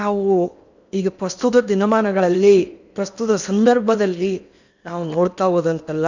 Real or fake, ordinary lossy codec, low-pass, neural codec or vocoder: fake; none; 7.2 kHz; codec, 16 kHz in and 24 kHz out, 0.8 kbps, FocalCodec, streaming, 65536 codes